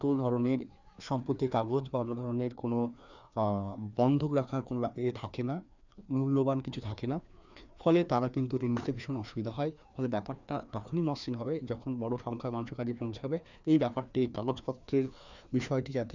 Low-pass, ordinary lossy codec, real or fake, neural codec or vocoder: 7.2 kHz; none; fake; codec, 16 kHz, 2 kbps, FreqCodec, larger model